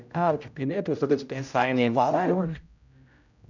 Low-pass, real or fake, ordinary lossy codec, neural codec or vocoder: 7.2 kHz; fake; none; codec, 16 kHz, 0.5 kbps, X-Codec, HuBERT features, trained on general audio